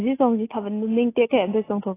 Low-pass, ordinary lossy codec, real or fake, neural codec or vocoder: 3.6 kHz; AAC, 16 kbps; real; none